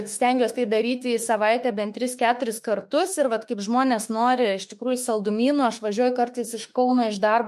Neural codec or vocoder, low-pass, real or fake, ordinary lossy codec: autoencoder, 48 kHz, 32 numbers a frame, DAC-VAE, trained on Japanese speech; 14.4 kHz; fake; MP3, 64 kbps